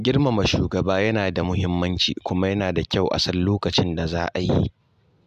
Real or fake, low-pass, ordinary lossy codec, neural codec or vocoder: real; 14.4 kHz; none; none